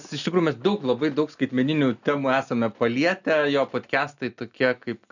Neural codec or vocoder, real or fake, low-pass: none; real; 7.2 kHz